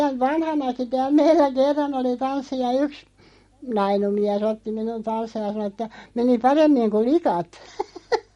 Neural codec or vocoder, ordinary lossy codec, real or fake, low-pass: none; MP3, 48 kbps; real; 19.8 kHz